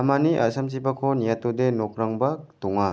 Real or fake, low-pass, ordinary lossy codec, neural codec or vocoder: real; none; none; none